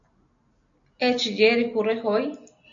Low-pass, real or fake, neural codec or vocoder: 7.2 kHz; real; none